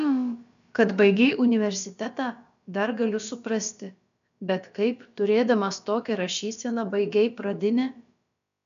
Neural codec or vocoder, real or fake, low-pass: codec, 16 kHz, about 1 kbps, DyCAST, with the encoder's durations; fake; 7.2 kHz